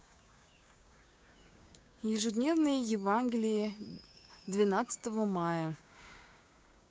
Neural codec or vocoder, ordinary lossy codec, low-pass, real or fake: codec, 16 kHz, 6 kbps, DAC; none; none; fake